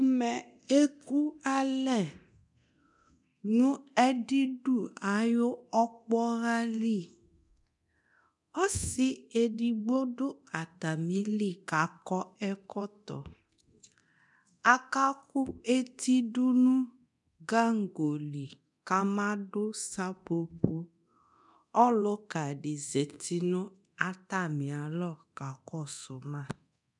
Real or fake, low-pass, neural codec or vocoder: fake; 10.8 kHz; codec, 24 kHz, 0.9 kbps, DualCodec